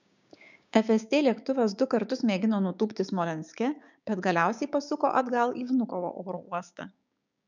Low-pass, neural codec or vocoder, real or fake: 7.2 kHz; codec, 16 kHz, 6 kbps, DAC; fake